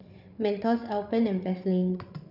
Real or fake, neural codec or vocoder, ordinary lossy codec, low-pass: fake; codec, 16 kHz, 8 kbps, FreqCodec, larger model; none; 5.4 kHz